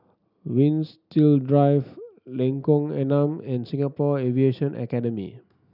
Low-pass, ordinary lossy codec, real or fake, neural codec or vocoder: 5.4 kHz; none; real; none